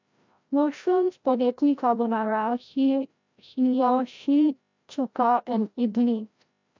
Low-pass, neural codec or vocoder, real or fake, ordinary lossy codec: 7.2 kHz; codec, 16 kHz, 0.5 kbps, FreqCodec, larger model; fake; MP3, 64 kbps